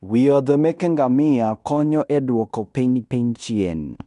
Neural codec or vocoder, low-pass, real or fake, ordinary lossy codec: codec, 16 kHz in and 24 kHz out, 0.9 kbps, LongCat-Audio-Codec, fine tuned four codebook decoder; 10.8 kHz; fake; none